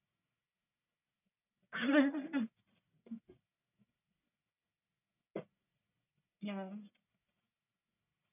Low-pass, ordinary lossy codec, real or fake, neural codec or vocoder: 3.6 kHz; none; fake; codec, 44.1 kHz, 1.7 kbps, Pupu-Codec